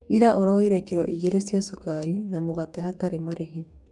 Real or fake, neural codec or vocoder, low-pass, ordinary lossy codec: fake; codec, 44.1 kHz, 2.6 kbps, DAC; 10.8 kHz; none